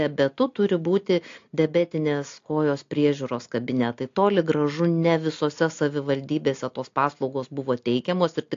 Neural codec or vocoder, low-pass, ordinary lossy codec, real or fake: none; 7.2 kHz; AAC, 48 kbps; real